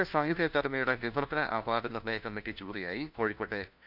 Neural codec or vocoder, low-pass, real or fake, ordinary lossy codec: codec, 16 kHz, 1 kbps, FunCodec, trained on LibriTTS, 50 frames a second; 5.4 kHz; fake; none